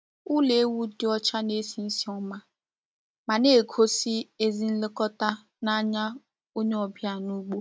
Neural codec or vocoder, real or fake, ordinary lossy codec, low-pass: none; real; none; none